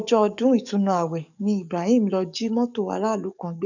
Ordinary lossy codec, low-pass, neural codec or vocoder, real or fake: none; 7.2 kHz; codec, 44.1 kHz, 7.8 kbps, DAC; fake